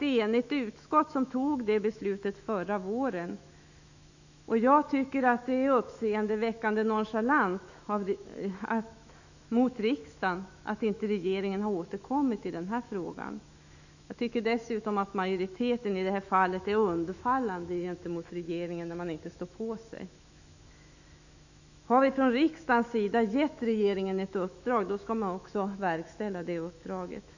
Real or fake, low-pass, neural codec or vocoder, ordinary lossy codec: fake; 7.2 kHz; autoencoder, 48 kHz, 128 numbers a frame, DAC-VAE, trained on Japanese speech; none